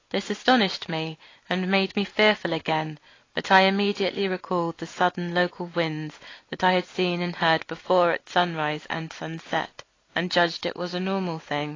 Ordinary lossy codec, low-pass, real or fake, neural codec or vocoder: AAC, 32 kbps; 7.2 kHz; real; none